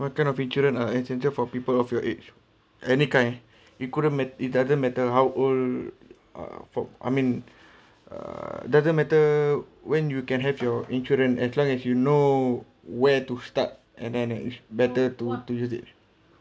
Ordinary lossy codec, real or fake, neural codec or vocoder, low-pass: none; real; none; none